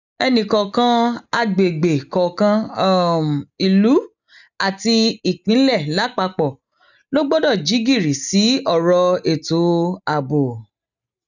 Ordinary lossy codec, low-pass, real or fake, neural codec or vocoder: none; 7.2 kHz; real; none